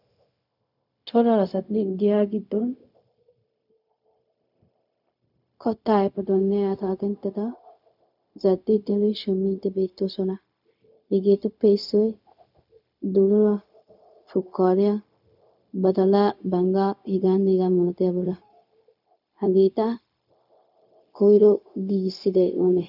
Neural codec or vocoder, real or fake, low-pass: codec, 16 kHz, 0.4 kbps, LongCat-Audio-Codec; fake; 5.4 kHz